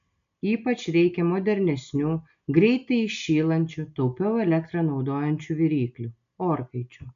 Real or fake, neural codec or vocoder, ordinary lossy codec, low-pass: real; none; MP3, 64 kbps; 7.2 kHz